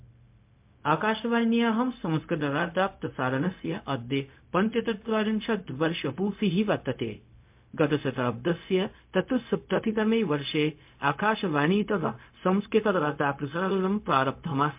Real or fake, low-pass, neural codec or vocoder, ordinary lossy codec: fake; 3.6 kHz; codec, 16 kHz, 0.4 kbps, LongCat-Audio-Codec; MP3, 32 kbps